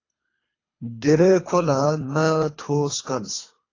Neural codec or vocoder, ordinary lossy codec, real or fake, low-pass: codec, 24 kHz, 3 kbps, HILCodec; AAC, 32 kbps; fake; 7.2 kHz